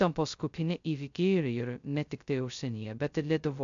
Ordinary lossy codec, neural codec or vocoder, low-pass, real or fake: MP3, 64 kbps; codec, 16 kHz, 0.2 kbps, FocalCodec; 7.2 kHz; fake